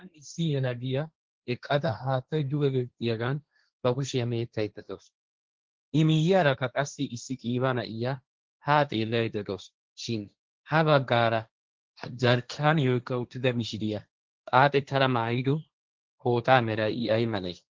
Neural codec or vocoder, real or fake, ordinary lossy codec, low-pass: codec, 16 kHz, 1.1 kbps, Voila-Tokenizer; fake; Opus, 16 kbps; 7.2 kHz